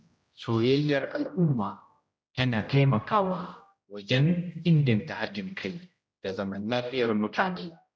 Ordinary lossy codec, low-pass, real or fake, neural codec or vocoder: none; none; fake; codec, 16 kHz, 0.5 kbps, X-Codec, HuBERT features, trained on general audio